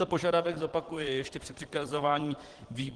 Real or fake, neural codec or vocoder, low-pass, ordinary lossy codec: fake; vocoder, 22.05 kHz, 80 mel bands, Vocos; 9.9 kHz; Opus, 16 kbps